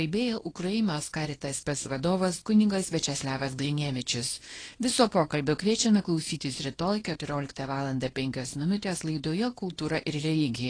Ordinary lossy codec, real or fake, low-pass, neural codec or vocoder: AAC, 32 kbps; fake; 9.9 kHz; codec, 24 kHz, 0.9 kbps, WavTokenizer, small release